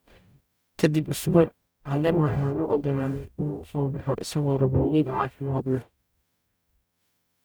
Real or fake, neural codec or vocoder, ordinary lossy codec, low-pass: fake; codec, 44.1 kHz, 0.9 kbps, DAC; none; none